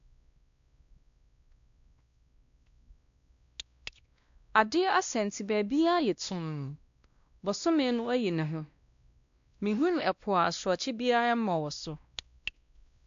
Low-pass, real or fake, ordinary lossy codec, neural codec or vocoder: 7.2 kHz; fake; AAC, 64 kbps; codec, 16 kHz, 1 kbps, X-Codec, WavLM features, trained on Multilingual LibriSpeech